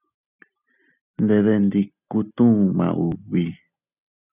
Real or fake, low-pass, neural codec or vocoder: real; 3.6 kHz; none